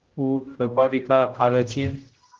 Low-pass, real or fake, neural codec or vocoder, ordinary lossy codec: 7.2 kHz; fake; codec, 16 kHz, 0.5 kbps, X-Codec, HuBERT features, trained on general audio; Opus, 16 kbps